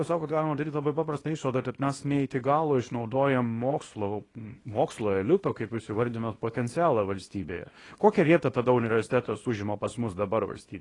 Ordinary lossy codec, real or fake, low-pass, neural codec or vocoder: AAC, 32 kbps; fake; 10.8 kHz; codec, 24 kHz, 0.9 kbps, WavTokenizer, small release